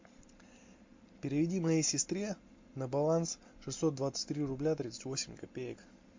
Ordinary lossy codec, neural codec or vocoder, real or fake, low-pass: MP3, 48 kbps; none; real; 7.2 kHz